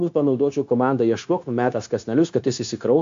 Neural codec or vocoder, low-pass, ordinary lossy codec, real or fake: codec, 16 kHz, 0.9 kbps, LongCat-Audio-Codec; 7.2 kHz; AAC, 64 kbps; fake